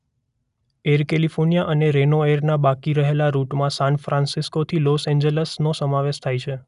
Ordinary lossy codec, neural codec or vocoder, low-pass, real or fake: none; none; 10.8 kHz; real